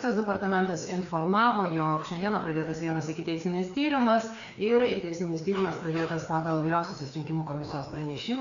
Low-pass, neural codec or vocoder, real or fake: 7.2 kHz; codec, 16 kHz, 2 kbps, FreqCodec, larger model; fake